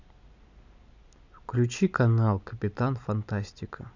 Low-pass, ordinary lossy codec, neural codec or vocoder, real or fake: 7.2 kHz; none; none; real